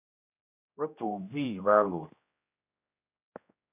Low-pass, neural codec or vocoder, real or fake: 3.6 kHz; codec, 16 kHz, 1 kbps, X-Codec, HuBERT features, trained on general audio; fake